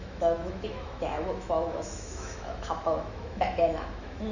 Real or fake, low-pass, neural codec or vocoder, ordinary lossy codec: fake; 7.2 kHz; autoencoder, 48 kHz, 128 numbers a frame, DAC-VAE, trained on Japanese speech; none